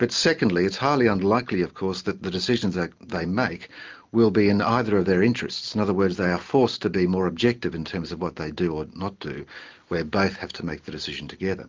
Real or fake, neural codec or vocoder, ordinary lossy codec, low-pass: real; none; Opus, 32 kbps; 7.2 kHz